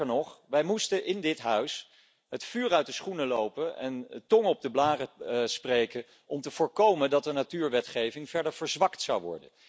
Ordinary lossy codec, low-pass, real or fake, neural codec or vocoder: none; none; real; none